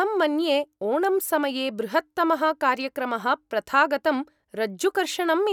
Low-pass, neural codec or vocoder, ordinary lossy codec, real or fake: 19.8 kHz; none; none; real